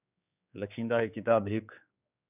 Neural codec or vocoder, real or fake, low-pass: codec, 16 kHz, 4 kbps, X-Codec, HuBERT features, trained on general audio; fake; 3.6 kHz